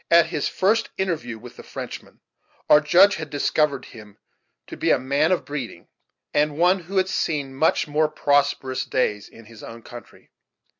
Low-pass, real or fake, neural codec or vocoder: 7.2 kHz; real; none